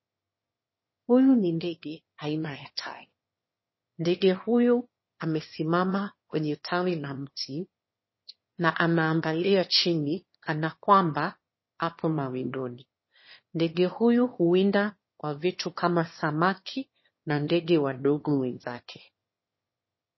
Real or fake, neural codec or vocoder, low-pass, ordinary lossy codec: fake; autoencoder, 22.05 kHz, a latent of 192 numbers a frame, VITS, trained on one speaker; 7.2 kHz; MP3, 24 kbps